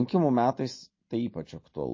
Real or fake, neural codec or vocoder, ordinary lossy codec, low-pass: real; none; MP3, 32 kbps; 7.2 kHz